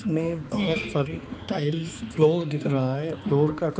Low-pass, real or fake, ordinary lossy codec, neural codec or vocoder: none; fake; none; codec, 16 kHz, 2 kbps, X-Codec, HuBERT features, trained on balanced general audio